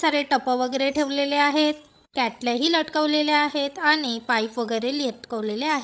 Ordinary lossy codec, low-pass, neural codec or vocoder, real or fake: none; none; codec, 16 kHz, 16 kbps, FreqCodec, larger model; fake